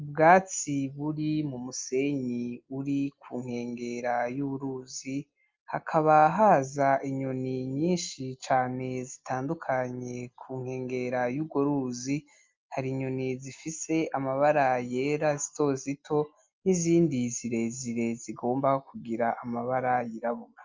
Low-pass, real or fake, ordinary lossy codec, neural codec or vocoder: 7.2 kHz; real; Opus, 24 kbps; none